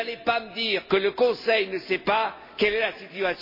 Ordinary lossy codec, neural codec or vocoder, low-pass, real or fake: MP3, 24 kbps; none; 5.4 kHz; real